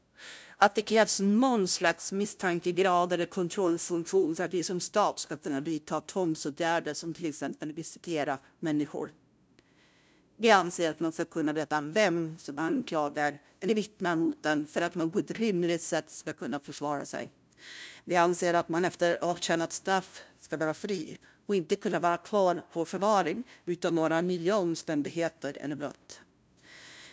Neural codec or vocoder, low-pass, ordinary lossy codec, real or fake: codec, 16 kHz, 0.5 kbps, FunCodec, trained on LibriTTS, 25 frames a second; none; none; fake